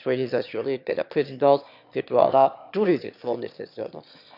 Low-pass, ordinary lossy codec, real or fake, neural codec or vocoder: 5.4 kHz; none; fake; autoencoder, 22.05 kHz, a latent of 192 numbers a frame, VITS, trained on one speaker